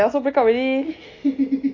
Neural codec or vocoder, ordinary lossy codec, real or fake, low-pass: none; AAC, 32 kbps; real; 7.2 kHz